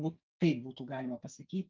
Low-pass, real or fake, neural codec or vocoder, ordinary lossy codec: 7.2 kHz; fake; codec, 44.1 kHz, 2.6 kbps, SNAC; Opus, 24 kbps